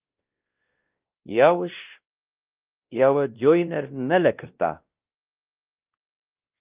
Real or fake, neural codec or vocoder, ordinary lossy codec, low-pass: fake; codec, 16 kHz, 1 kbps, X-Codec, WavLM features, trained on Multilingual LibriSpeech; Opus, 24 kbps; 3.6 kHz